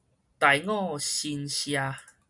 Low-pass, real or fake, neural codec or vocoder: 10.8 kHz; real; none